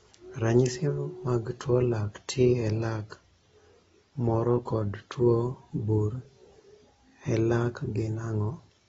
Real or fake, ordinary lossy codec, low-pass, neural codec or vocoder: real; AAC, 24 kbps; 10.8 kHz; none